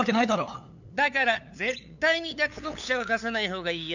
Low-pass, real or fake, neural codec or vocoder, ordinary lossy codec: 7.2 kHz; fake; codec, 16 kHz, 8 kbps, FunCodec, trained on LibriTTS, 25 frames a second; none